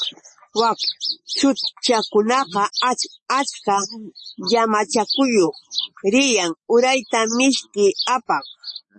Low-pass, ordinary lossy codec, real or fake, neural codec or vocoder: 10.8 kHz; MP3, 32 kbps; real; none